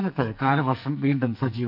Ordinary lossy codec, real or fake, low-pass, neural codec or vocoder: none; fake; 5.4 kHz; codec, 44.1 kHz, 2.6 kbps, SNAC